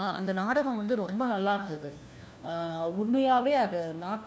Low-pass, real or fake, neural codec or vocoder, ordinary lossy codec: none; fake; codec, 16 kHz, 1 kbps, FunCodec, trained on LibriTTS, 50 frames a second; none